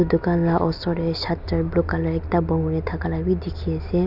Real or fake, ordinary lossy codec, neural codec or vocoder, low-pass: real; none; none; 5.4 kHz